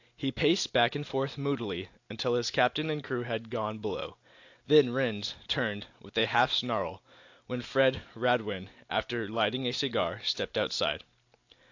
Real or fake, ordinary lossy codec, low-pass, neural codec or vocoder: real; AAC, 48 kbps; 7.2 kHz; none